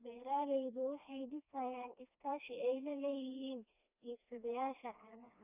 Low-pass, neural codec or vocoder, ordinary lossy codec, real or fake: 3.6 kHz; codec, 16 kHz, 2 kbps, FreqCodec, smaller model; none; fake